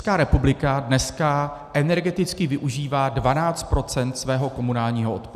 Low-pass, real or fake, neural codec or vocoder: 14.4 kHz; real; none